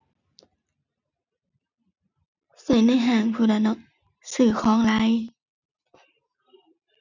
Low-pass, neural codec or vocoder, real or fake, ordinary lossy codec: 7.2 kHz; none; real; none